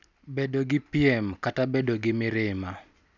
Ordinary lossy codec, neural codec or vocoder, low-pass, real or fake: none; none; 7.2 kHz; real